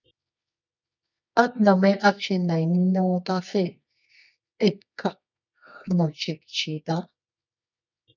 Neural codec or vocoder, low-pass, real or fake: codec, 24 kHz, 0.9 kbps, WavTokenizer, medium music audio release; 7.2 kHz; fake